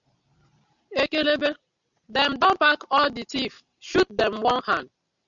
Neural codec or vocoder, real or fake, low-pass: none; real; 7.2 kHz